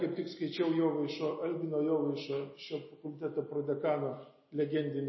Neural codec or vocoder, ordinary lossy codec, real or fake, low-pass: none; MP3, 24 kbps; real; 7.2 kHz